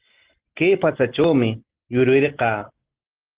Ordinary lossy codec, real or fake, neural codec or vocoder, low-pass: Opus, 16 kbps; real; none; 3.6 kHz